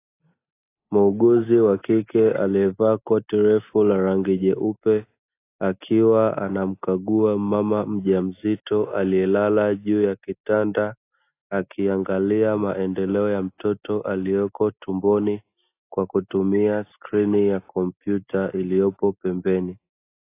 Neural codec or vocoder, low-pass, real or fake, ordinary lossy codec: none; 3.6 kHz; real; AAC, 24 kbps